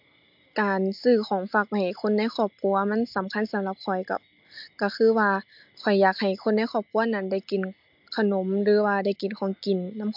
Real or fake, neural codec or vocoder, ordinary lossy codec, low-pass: real; none; MP3, 48 kbps; 5.4 kHz